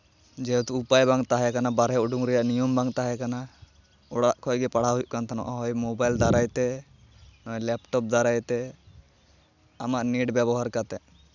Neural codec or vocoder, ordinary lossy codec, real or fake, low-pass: none; none; real; 7.2 kHz